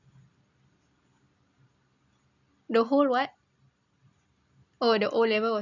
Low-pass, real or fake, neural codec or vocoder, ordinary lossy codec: 7.2 kHz; real; none; none